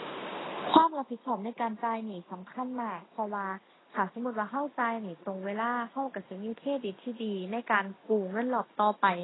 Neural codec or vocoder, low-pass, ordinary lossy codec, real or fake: none; 7.2 kHz; AAC, 16 kbps; real